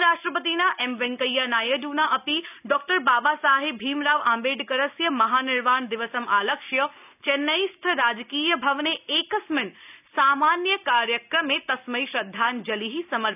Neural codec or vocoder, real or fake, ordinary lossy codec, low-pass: none; real; none; 3.6 kHz